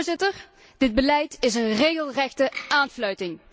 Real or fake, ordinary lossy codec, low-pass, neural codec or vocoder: real; none; none; none